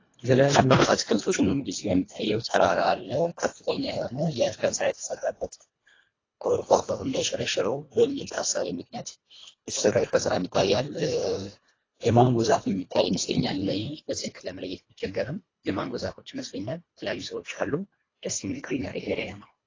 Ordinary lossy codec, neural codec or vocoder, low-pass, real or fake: AAC, 32 kbps; codec, 24 kHz, 1.5 kbps, HILCodec; 7.2 kHz; fake